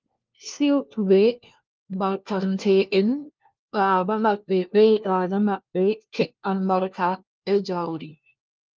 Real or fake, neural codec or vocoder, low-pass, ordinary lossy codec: fake; codec, 16 kHz, 1 kbps, FunCodec, trained on LibriTTS, 50 frames a second; 7.2 kHz; Opus, 32 kbps